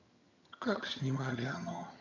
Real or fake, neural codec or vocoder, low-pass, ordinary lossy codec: fake; vocoder, 22.05 kHz, 80 mel bands, HiFi-GAN; 7.2 kHz; none